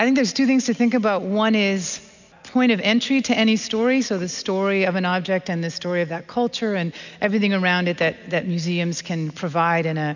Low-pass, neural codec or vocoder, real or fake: 7.2 kHz; none; real